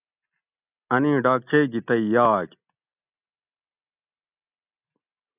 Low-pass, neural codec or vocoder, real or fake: 3.6 kHz; none; real